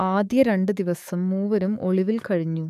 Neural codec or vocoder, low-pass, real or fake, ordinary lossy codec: codec, 44.1 kHz, 7.8 kbps, DAC; 14.4 kHz; fake; none